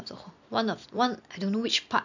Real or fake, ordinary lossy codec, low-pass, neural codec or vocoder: real; none; 7.2 kHz; none